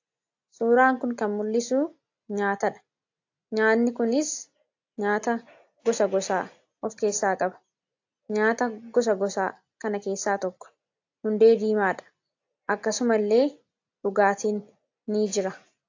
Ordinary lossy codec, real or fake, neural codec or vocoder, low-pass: AAC, 48 kbps; real; none; 7.2 kHz